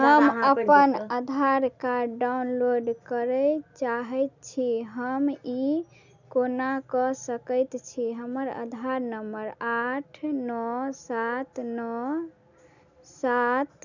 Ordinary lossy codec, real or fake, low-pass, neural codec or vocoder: none; real; 7.2 kHz; none